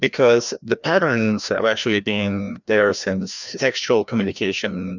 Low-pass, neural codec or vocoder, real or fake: 7.2 kHz; codec, 16 kHz, 1 kbps, FreqCodec, larger model; fake